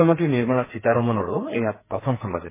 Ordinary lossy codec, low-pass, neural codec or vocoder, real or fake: MP3, 16 kbps; 3.6 kHz; codec, 44.1 kHz, 2.6 kbps, SNAC; fake